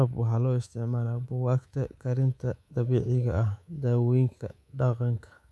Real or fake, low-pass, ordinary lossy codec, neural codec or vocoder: fake; none; none; codec, 24 kHz, 3.1 kbps, DualCodec